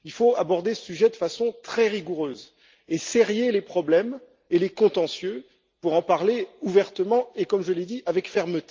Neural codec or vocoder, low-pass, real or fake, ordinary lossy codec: none; 7.2 kHz; real; Opus, 32 kbps